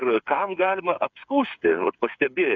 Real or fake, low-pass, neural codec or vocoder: fake; 7.2 kHz; codec, 16 kHz, 8 kbps, FreqCodec, smaller model